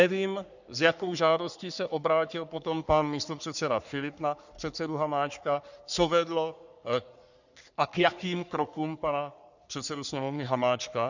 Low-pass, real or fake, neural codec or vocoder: 7.2 kHz; fake; codec, 44.1 kHz, 3.4 kbps, Pupu-Codec